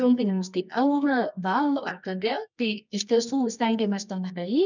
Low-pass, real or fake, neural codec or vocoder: 7.2 kHz; fake; codec, 24 kHz, 0.9 kbps, WavTokenizer, medium music audio release